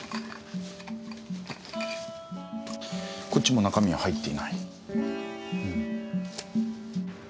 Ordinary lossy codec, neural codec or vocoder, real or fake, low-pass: none; none; real; none